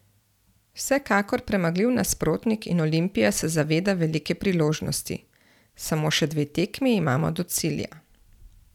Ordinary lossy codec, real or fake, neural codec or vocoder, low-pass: none; real; none; 19.8 kHz